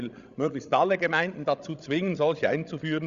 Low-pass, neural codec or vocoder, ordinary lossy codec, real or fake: 7.2 kHz; codec, 16 kHz, 16 kbps, FreqCodec, larger model; Opus, 64 kbps; fake